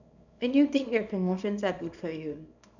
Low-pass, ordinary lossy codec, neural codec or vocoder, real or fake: 7.2 kHz; none; codec, 24 kHz, 0.9 kbps, WavTokenizer, small release; fake